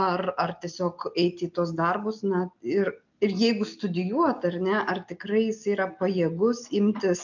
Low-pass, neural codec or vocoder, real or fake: 7.2 kHz; none; real